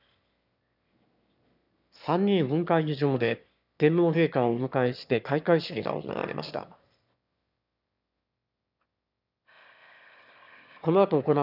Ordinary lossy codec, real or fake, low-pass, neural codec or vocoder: none; fake; 5.4 kHz; autoencoder, 22.05 kHz, a latent of 192 numbers a frame, VITS, trained on one speaker